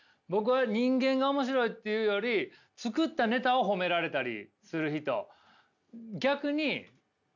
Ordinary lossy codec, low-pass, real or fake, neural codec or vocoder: none; 7.2 kHz; real; none